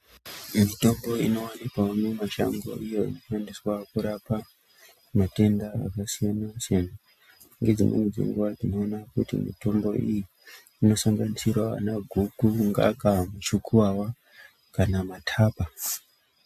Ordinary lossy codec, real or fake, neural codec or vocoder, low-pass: MP3, 96 kbps; real; none; 14.4 kHz